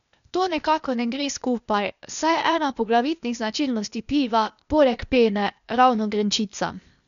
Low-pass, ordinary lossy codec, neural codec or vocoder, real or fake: 7.2 kHz; none; codec, 16 kHz, 0.8 kbps, ZipCodec; fake